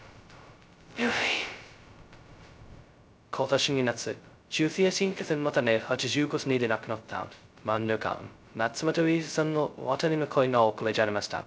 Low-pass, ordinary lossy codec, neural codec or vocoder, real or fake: none; none; codec, 16 kHz, 0.2 kbps, FocalCodec; fake